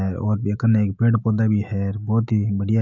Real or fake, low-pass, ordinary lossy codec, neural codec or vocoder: fake; 7.2 kHz; none; autoencoder, 48 kHz, 128 numbers a frame, DAC-VAE, trained on Japanese speech